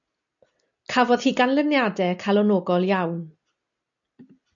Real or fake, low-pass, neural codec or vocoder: real; 7.2 kHz; none